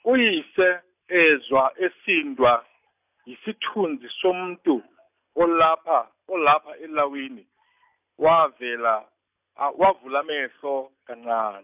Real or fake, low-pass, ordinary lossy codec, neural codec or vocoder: real; 3.6 kHz; none; none